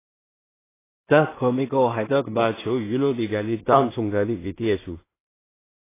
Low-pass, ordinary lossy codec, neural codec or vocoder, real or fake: 3.6 kHz; AAC, 16 kbps; codec, 16 kHz in and 24 kHz out, 0.4 kbps, LongCat-Audio-Codec, two codebook decoder; fake